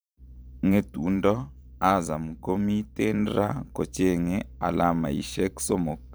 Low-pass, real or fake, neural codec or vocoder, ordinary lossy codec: none; fake; vocoder, 44.1 kHz, 128 mel bands every 512 samples, BigVGAN v2; none